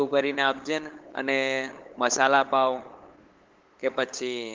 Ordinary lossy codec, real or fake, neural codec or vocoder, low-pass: Opus, 16 kbps; fake; codec, 16 kHz, 8 kbps, FunCodec, trained on LibriTTS, 25 frames a second; 7.2 kHz